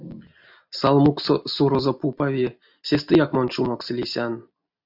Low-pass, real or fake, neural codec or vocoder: 5.4 kHz; real; none